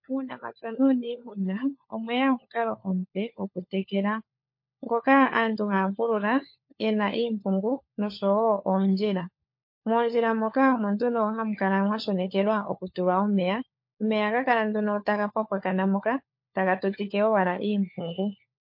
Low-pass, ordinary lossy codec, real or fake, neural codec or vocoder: 5.4 kHz; MP3, 32 kbps; fake; codec, 16 kHz, 4 kbps, FunCodec, trained on LibriTTS, 50 frames a second